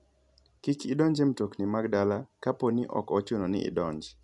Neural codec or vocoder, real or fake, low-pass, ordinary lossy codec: none; real; 10.8 kHz; none